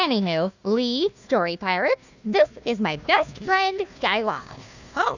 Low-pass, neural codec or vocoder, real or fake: 7.2 kHz; codec, 16 kHz, 1 kbps, FunCodec, trained on Chinese and English, 50 frames a second; fake